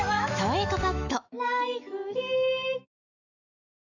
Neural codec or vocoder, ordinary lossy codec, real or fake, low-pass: autoencoder, 48 kHz, 128 numbers a frame, DAC-VAE, trained on Japanese speech; none; fake; 7.2 kHz